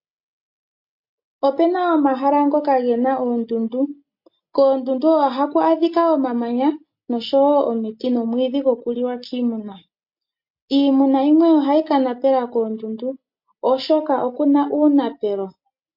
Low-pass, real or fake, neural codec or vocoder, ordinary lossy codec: 5.4 kHz; real; none; MP3, 32 kbps